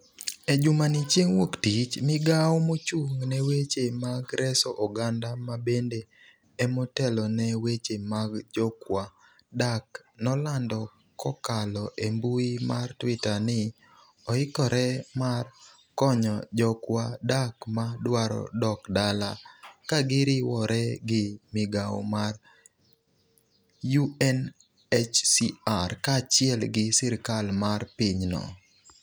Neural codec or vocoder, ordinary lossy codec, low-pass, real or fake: none; none; none; real